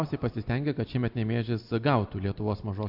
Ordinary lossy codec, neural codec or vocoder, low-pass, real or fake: AAC, 48 kbps; none; 5.4 kHz; real